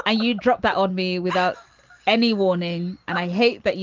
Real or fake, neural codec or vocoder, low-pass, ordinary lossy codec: real; none; 7.2 kHz; Opus, 32 kbps